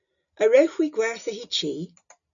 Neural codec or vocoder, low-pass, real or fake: none; 7.2 kHz; real